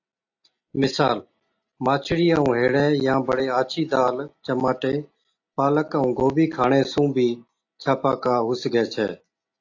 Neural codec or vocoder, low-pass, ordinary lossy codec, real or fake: none; 7.2 kHz; AAC, 48 kbps; real